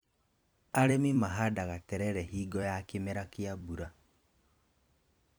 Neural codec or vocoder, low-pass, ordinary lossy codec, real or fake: vocoder, 44.1 kHz, 128 mel bands every 256 samples, BigVGAN v2; none; none; fake